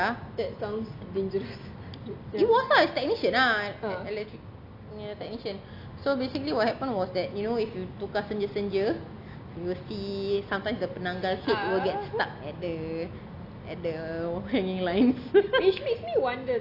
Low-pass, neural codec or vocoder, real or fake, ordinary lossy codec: 5.4 kHz; none; real; none